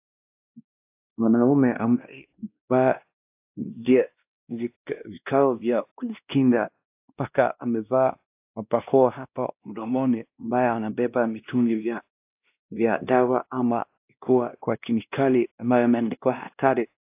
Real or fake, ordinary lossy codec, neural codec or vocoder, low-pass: fake; AAC, 32 kbps; codec, 16 kHz, 1 kbps, X-Codec, WavLM features, trained on Multilingual LibriSpeech; 3.6 kHz